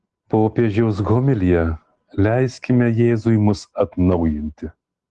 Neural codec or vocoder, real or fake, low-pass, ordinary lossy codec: none; real; 9.9 kHz; Opus, 16 kbps